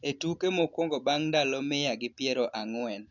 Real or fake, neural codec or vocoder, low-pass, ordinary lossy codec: real; none; 7.2 kHz; none